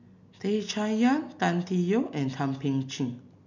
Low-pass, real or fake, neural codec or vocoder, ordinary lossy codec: 7.2 kHz; real; none; none